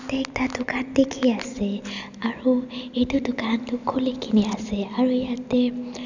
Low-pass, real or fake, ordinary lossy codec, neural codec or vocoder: 7.2 kHz; real; none; none